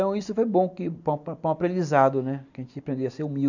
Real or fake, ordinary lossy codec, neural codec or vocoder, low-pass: real; none; none; 7.2 kHz